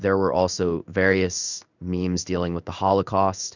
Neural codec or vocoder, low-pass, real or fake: codec, 16 kHz in and 24 kHz out, 1 kbps, XY-Tokenizer; 7.2 kHz; fake